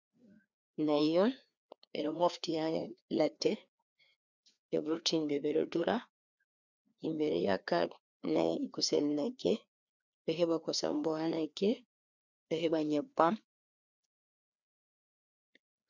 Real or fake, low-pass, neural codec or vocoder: fake; 7.2 kHz; codec, 16 kHz, 2 kbps, FreqCodec, larger model